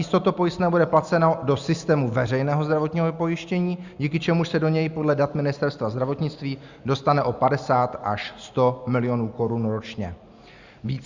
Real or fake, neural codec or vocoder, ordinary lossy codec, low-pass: real; none; Opus, 64 kbps; 7.2 kHz